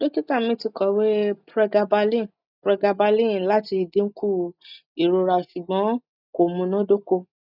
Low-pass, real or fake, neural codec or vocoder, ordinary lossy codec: 5.4 kHz; real; none; none